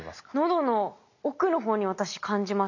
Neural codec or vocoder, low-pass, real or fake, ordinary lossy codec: none; 7.2 kHz; real; none